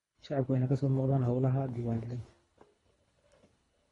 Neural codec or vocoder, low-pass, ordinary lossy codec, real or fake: codec, 24 kHz, 3 kbps, HILCodec; 10.8 kHz; AAC, 32 kbps; fake